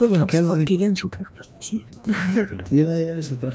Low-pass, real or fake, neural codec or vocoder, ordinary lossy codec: none; fake; codec, 16 kHz, 1 kbps, FreqCodec, larger model; none